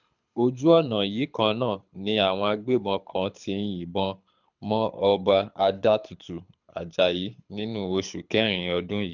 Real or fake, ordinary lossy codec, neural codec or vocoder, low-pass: fake; none; codec, 24 kHz, 6 kbps, HILCodec; 7.2 kHz